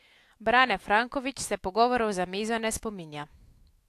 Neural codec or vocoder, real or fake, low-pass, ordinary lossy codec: autoencoder, 48 kHz, 128 numbers a frame, DAC-VAE, trained on Japanese speech; fake; 14.4 kHz; AAC, 64 kbps